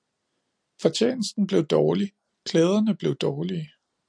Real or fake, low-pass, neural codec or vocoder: real; 9.9 kHz; none